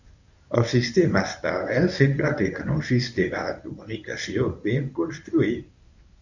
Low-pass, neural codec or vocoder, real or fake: 7.2 kHz; codec, 24 kHz, 0.9 kbps, WavTokenizer, medium speech release version 1; fake